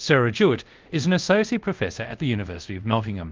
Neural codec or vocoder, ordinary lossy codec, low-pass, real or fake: codec, 24 kHz, 0.5 kbps, DualCodec; Opus, 32 kbps; 7.2 kHz; fake